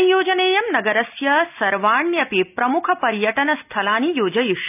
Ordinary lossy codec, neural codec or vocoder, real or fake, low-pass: none; none; real; 3.6 kHz